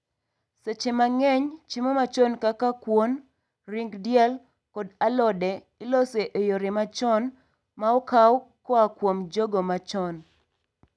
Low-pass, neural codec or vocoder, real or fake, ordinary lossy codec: none; none; real; none